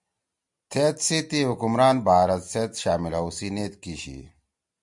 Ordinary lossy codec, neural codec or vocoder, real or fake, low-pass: MP3, 64 kbps; none; real; 10.8 kHz